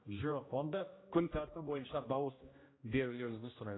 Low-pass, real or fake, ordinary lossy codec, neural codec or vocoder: 7.2 kHz; fake; AAC, 16 kbps; codec, 16 kHz, 1 kbps, X-Codec, HuBERT features, trained on general audio